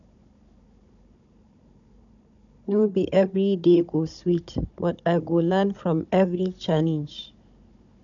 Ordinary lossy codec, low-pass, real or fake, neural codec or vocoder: none; 7.2 kHz; fake; codec, 16 kHz, 16 kbps, FunCodec, trained on Chinese and English, 50 frames a second